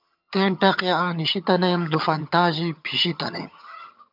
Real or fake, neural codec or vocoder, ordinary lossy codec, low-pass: fake; vocoder, 22.05 kHz, 80 mel bands, HiFi-GAN; MP3, 48 kbps; 5.4 kHz